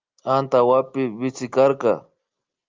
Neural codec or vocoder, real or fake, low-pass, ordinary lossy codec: none; real; 7.2 kHz; Opus, 32 kbps